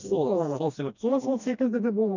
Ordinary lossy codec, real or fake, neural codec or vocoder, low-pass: none; fake; codec, 16 kHz, 1 kbps, FreqCodec, smaller model; 7.2 kHz